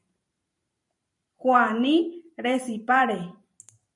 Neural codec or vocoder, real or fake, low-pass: vocoder, 44.1 kHz, 128 mel bands every 512 samples, BigVGAN v2; fake; 10.8 kHz